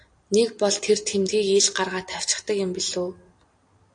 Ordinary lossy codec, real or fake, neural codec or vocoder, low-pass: AAC, 48 kbps; real; none; 9.9 kHz